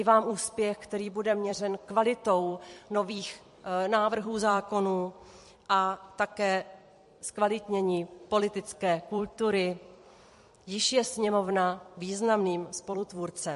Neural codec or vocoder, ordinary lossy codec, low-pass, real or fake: vocoder, 44.1 kHz, 128 mel bands every 256 samples, BigVGAN v2; MP3, 48 kbps; 14.4 kHz; fake